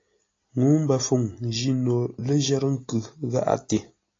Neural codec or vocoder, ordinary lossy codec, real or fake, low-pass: none; AAC, 32 kbps; real; 7.2 kHz